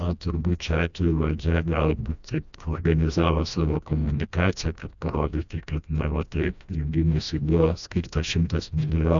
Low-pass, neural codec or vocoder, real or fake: 7.2 kHz; codec, 16 kHz, 1 kbps, FreqCodec, smaller model; fake